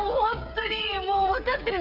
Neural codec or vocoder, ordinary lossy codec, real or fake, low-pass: codec, 16 kHz, 8 kbps, FreqCodec, smaller model; none; fake; 5.4 kHz